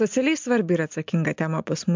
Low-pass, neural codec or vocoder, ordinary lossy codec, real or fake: 7.2 kHz; none; MP3, 64 kbps; real